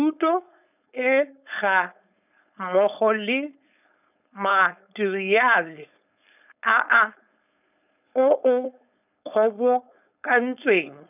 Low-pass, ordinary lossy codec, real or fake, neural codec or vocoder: 3.6 kHz; none; fake; codec, 16 kHz, 4.8 kbps, FACodec